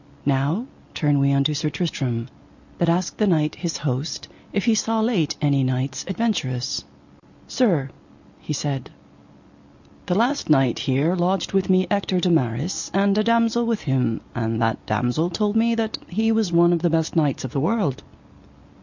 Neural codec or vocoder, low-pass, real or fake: none; 7.2 kHz; real